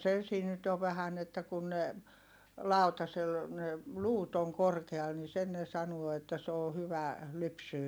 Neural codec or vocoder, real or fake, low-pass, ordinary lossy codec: none; real; none; none